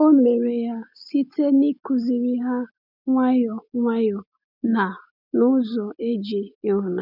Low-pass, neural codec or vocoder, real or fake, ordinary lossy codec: 5.4 kHz; none; real; none